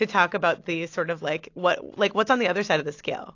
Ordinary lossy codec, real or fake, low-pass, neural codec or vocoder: AAC, 48 kbps; real; 7.2 kHz; none